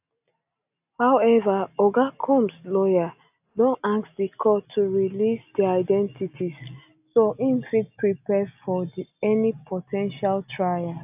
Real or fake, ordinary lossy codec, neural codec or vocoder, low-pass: real; AAC, 32 kbps; none; 3.6 kHz